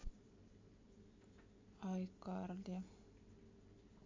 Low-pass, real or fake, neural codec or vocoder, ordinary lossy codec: 7.2 kHz; real; none; MP3, 64 kbps